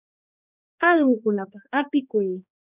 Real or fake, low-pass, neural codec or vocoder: fake; 3.6 kHz; codec, 16 kHz, 2 kbps, X-Codec, HuBERT features, trained on general audio